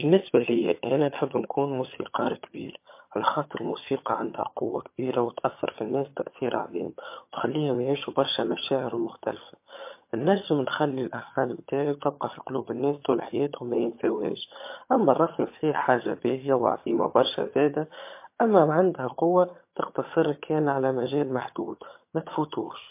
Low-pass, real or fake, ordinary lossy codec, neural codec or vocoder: 3.6 kHz; fake; MP3, 24 kbps; vocoder, 22.05 kHz, 80 mel bands, HiFi-GAN